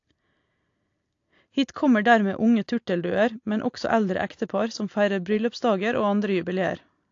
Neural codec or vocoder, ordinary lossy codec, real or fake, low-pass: none; AAC, 48 kbps; real; 7.2 kHz